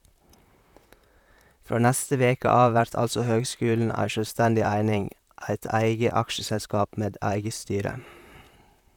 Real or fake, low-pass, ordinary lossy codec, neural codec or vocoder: fake; 19.8 kHz; none; vocoder, 44.1 kHz, 128 mel bands, Pupu-Vocoder